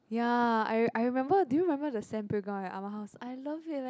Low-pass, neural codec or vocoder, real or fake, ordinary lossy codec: none; none; real; none